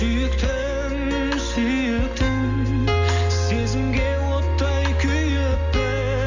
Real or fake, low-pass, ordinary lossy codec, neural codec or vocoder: real; 7.2 kHz; none; none